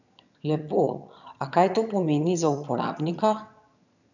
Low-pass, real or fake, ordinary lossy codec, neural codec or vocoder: 7.2 kHz; fake; none; vocoder, 22.05 kHz, 80 mel bands, HiFi-GAN